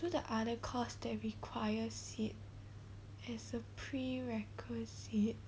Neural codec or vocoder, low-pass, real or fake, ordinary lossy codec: none; none; real; none